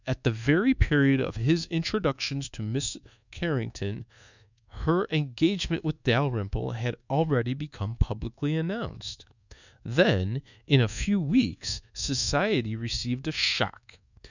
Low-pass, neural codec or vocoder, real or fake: 7.2 kHz; codec, 24 kHz, 1.2 kbps, DualCodec; fake